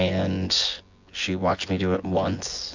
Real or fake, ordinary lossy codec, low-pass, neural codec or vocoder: fake; AAC, 48 kbps; 7.2 kHz; vocoder, 24 kHz, 100 mel bands, Vocos